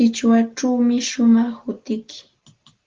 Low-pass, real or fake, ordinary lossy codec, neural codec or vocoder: 7.2 kHz; real; Opus, 16 kbps; none